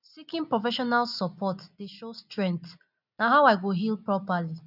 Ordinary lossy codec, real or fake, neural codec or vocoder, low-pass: none; real; none; 5.4 kHz